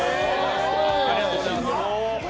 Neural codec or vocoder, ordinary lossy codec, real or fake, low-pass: none; none; real; none